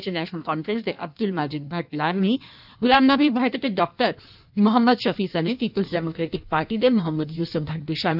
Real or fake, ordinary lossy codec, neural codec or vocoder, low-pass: fake; none; codec, 16 kHz in and 24 kHz out, 1.1 kbps, FireRedTTS-2 codec; 5.4 kHz